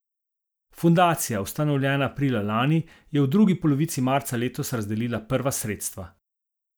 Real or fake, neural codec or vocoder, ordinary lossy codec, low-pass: real; none; none; none